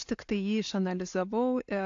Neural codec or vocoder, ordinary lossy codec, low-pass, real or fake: none; AAC, 48 kbps; 7.2 kHz; real